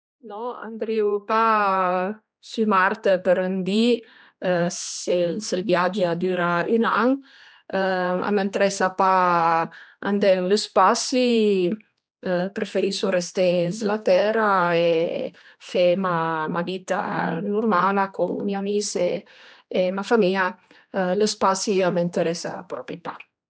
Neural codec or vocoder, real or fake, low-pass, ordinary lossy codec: codec, 16 kHz, 2 kbps, X-Codec, HuBERT features, trained on general audio; fake; none; none